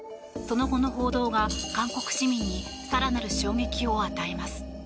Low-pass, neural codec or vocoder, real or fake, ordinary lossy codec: none; none; real; none